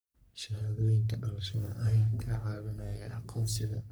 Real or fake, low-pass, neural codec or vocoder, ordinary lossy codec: fake; none; codec, 44.1 kHz, 3.4 kbps, Pupu-Codec; none